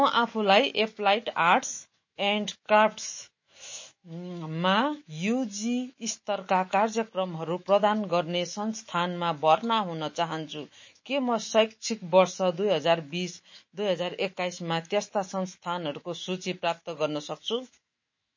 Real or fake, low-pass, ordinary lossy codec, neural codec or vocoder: fake; 7.2 kHz; MP3, 32 kbps; autoencoder, 48 kHz, 128 numbers a frame, DAC-VAE, trained on Japanese speech